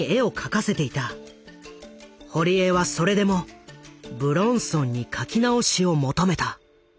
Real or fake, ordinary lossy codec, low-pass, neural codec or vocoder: real; none; none; none